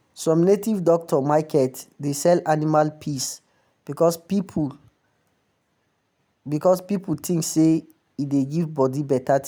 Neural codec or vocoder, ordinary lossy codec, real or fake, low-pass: none; none; real; none